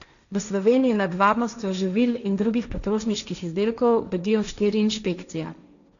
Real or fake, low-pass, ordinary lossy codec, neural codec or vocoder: fake; 7.2 kHz; none; codec, 16 kHz, 1.1 kbps, Voila-Tokenizer